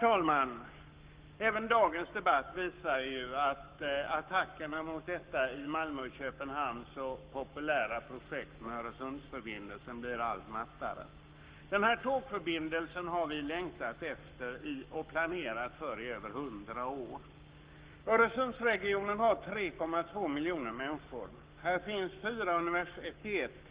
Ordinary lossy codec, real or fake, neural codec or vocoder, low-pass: Opus, 32 kbps; fake; codec, 44.1 kHz, 7.8 kbps, Pupu-Codec; 3.6 kHz